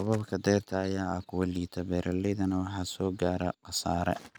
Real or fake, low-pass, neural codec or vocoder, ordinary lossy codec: real; none; none; none